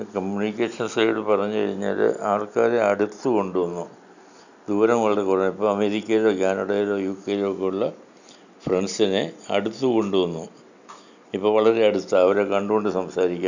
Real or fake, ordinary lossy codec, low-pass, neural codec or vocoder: real; none; 7.2 kHz; none